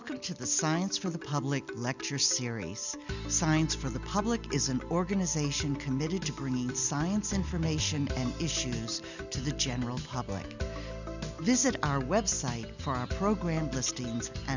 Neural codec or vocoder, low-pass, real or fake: none; 7.2 kHz; real